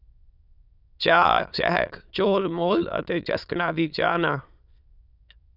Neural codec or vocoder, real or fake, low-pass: autoencoder, 22.05 kHz, a latent of 192 numbers a frame, VITS, trained on many speakers; fake; 5.4 kHz